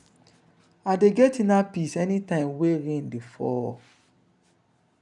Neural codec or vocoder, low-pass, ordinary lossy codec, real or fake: none; 10.8 kHz; none; real